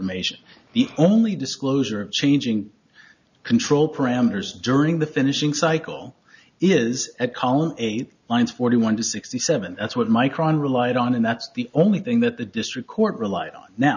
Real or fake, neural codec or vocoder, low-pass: real; none; 7.2 kHz